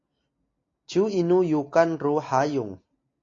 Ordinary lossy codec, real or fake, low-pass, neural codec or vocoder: AAC, 32 kbps; real; 7.2 kHz; none